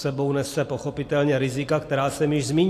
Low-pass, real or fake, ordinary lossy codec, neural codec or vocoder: 14.4 kHz; real; AAC, 64 kbps; none